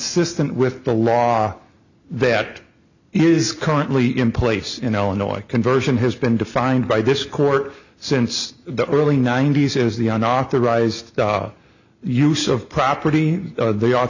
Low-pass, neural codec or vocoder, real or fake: 7.2 kHz; none; real